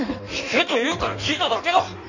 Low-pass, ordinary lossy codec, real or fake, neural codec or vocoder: 7.2 kHz; none; fake; codec, 16 kHz in and 24 kHz out, 1.1 kbps, FireRedTTS-2 codec